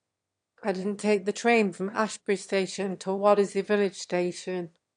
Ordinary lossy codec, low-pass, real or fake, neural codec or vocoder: MP3, 64 kbps; 9.9 kHz; fake; autoencoder, 22.05 kHz, a latent of 192 numbers a frame, VITS, trained on one speaker